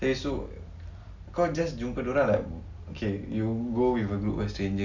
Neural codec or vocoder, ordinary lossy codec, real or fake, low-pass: none; none; real; 7.2 kHz